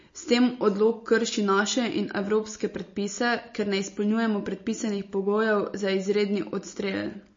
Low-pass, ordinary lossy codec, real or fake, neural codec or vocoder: 7.2 kHz; MP3, 32 kbps; real; none